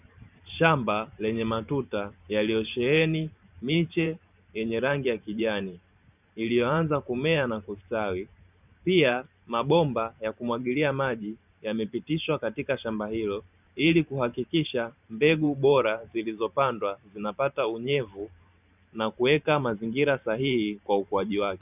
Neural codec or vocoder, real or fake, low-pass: vocoder, 44.1 kHz, 128 mel bands every 512 samples, BigVGAN v2; fake; 3.6 kHz